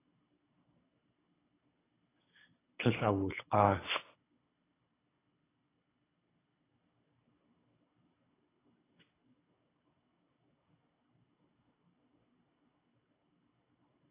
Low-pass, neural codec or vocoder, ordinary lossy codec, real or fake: 3.6 kHz; codec, 24 kHz, 6 kbps, HILCodec; AAC, 16 kbps; fake